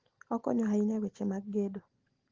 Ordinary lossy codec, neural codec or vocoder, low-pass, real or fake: Opus, 16 kbps; none; 7.2 kHz; real